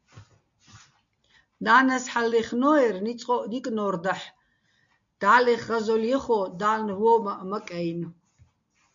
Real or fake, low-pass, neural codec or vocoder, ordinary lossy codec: real; 7.2 kHz; none; AAC, 64 kbps